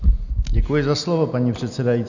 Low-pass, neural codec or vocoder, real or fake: 7.2 kHz; none; real